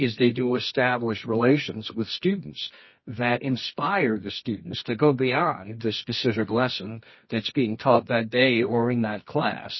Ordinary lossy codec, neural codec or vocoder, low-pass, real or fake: MP3, 24 kbps; codec, 24 kHz, 0.9 kbps, WavTokenizer, medium music audio release; 7.2 kHz; fake